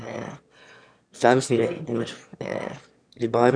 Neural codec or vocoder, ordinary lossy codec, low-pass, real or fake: autoencoder, 22.05 kHz, a latent of 192 numbers a frame, VITS, trained on one speaker; none; 9.9 kHz; fake